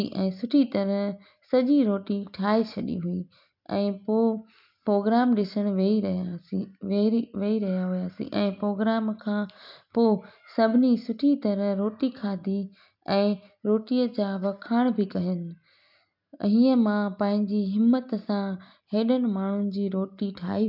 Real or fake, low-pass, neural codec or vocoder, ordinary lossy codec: real; 5.4 kHz; none; MP3, 48 kbps